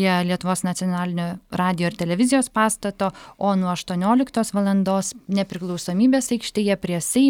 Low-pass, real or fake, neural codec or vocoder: 19.8 kHz; real; none